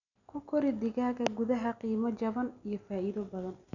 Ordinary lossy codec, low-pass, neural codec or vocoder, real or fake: none; 7.2 kHz; none; real